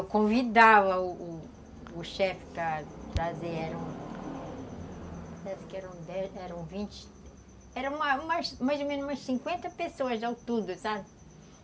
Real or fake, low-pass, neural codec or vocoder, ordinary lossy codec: real; none; none; none